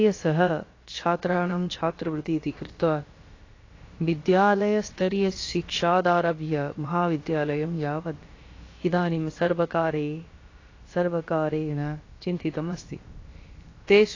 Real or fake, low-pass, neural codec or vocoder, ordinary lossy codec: fake; 7.2 kHz; codec, 16 kHz, about 1 kbps, DyCAST, with the encoder's durations; AAC, 32 kbps